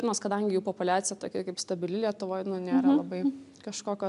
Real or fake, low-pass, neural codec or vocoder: real; 10.8 kHz; none